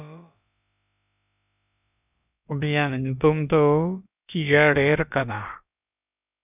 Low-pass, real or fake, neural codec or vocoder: 3.6 kHz; fake; codec, 16 kHz, about 1 kbps, DyCAST, with the encoder's durations